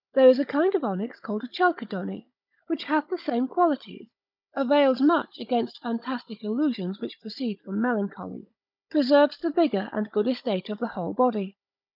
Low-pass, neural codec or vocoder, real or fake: 5.4 kHz; codec, 16 kHz, 16 kbps, FunCodec, trained on Chinese and English, 50 frames a second; fake